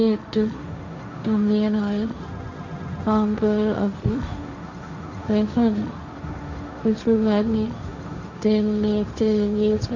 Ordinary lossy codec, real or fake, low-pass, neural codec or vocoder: none; fake; 7.2 kHz; codec, 16 kHz, 1.1 kbps, Voila-Tokenizer